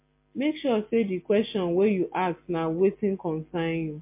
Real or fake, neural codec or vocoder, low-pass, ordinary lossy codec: real; none; 3.6 kHz; MP3, 24 kbps